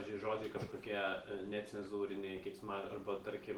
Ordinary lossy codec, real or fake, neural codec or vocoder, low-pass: Opus, 16 kbps; real; none; 19.8 kHz